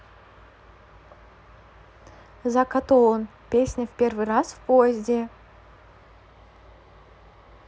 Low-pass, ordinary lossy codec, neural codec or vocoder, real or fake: none; none; none; real